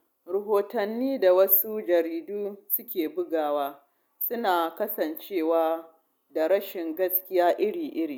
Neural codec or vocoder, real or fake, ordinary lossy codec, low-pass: none; real; none; none